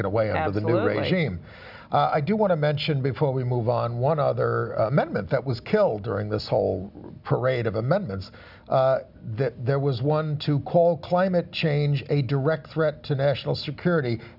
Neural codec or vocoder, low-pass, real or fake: none; 5.4 kHz; real